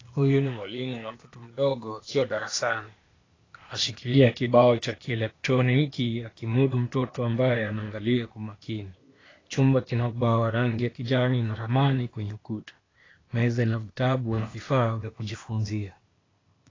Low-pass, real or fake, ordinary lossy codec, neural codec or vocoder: 7.2 kHz; fake; AAC, 32 kbps; codec, 16 kHz, 0.8 kbps, ZipCodec